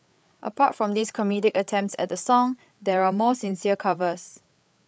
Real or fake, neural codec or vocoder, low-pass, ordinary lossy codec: fake; codec, 16 kHz, 8 kbps, FreqCodec, larger model; none; none